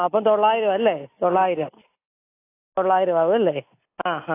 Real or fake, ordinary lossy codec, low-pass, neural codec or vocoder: real; AAC, 24 kbps; 3.6 kHz; none